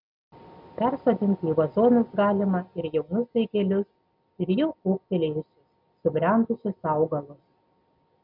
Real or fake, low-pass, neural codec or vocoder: real; 5.4 kHz; none